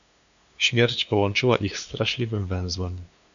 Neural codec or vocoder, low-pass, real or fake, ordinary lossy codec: codec, 16 kHz, 2 kbps, FunCodec, trained on LibriTTS, 25 frames a second; 7.2 kHz; fake; AAC, 96 kbps